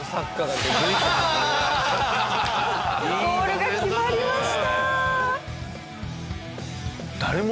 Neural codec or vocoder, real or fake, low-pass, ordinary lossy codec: none; real; none; none